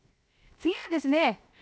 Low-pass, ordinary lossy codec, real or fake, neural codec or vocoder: none; none; fake; codec, 16 kHz, 0.7 kbps, FocalCodec